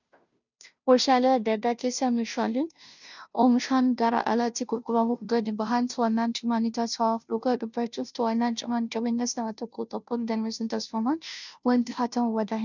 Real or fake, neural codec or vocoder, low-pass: fake; codec, 16 kHz, 0.5 kbps, FunCodec, trained on Chinese and English, 25 frames a second; 7.2 kHz